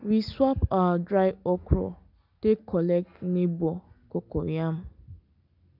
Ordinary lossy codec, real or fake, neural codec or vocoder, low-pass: none; real; none; 5.4 kHz